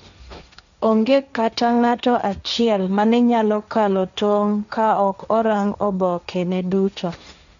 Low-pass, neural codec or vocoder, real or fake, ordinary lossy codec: 7.2 kHz; codec, 16 kHz, 1.1 kbps, Voila-Tokenizer; fake; none